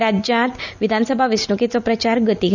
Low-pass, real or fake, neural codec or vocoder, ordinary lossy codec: 7.2 kHz; real; none; none